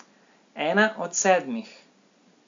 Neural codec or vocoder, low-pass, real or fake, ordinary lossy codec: none; 7.2 kHz; real; AAC, 64 kbps